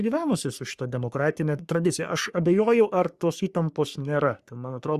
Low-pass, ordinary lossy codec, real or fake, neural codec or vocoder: 14.4 kHz; Opus, 64 kbps; fake; codec, 44.1 kHz, 3.4 kbps, Pupu-Codec